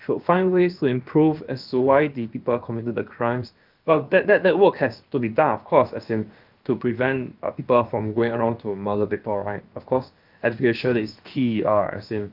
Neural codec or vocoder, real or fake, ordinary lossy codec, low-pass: codec, 16 kHz, about 1 kbps, DyCAST, with the encoder's durations; fake; Opus, 24 kbps; 5.4 kHz